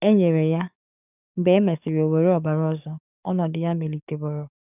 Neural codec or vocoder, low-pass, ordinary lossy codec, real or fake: codec, 44.1 kHz, 7.8 kbps, DAC; 3.6 kHz; none; fake